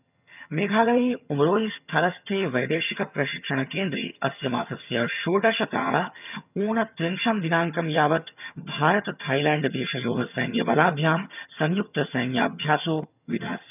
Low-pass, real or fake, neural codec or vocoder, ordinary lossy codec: 3.6 kHz; fake; vocoder, 22.05 kHz, 80 mel bands, HiFi-GAN; none